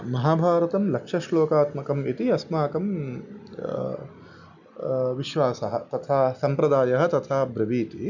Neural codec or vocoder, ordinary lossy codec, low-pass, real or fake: none; none; 7.2 kHz; real